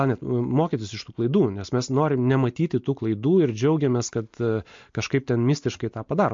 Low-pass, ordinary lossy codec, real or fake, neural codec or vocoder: 7.2 kHz; MP3, 48 kbps; real; none